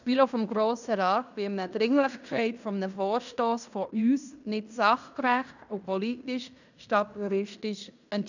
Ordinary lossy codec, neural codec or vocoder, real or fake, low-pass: none; codec, 16 kHz in and 24 kHz out, 0.9 kbps, LongCat-Audio-Codec, fine tuned four codebook decoder; fake; 7.2 kHz